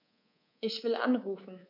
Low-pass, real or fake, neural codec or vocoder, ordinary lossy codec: 5.4 kHz; fake; codec, 24 kHz, 3.1 kbps, DualCodec; none